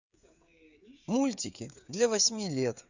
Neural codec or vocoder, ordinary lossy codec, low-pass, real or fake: codec, 16 kHz, 16 kbps, FreqCodec, smaller model; Opus, 64 kbps; 7.2 kHz; fake